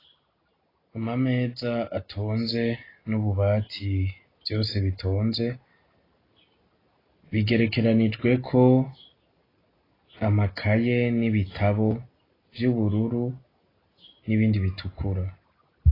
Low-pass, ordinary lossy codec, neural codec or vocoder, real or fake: 5.4 kHz; AAC, 24 kbps; none; real